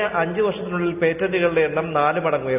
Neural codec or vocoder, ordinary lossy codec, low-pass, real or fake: none; none; 3.6 kHz; real